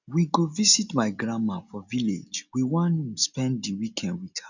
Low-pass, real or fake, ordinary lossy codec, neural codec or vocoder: 7.2 kHz; real; none; none